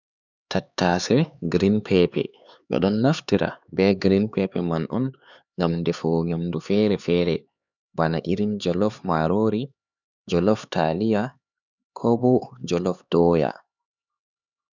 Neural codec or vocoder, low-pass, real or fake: codec, 16 kHz, 4 kbps, X-Codec, HuBERT features, trained on LibriSpeech; 7.2 kHz; fake